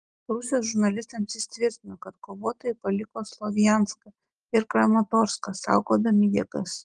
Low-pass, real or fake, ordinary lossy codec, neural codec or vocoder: 9.9 kHz; real; Opus, 24 kbps; none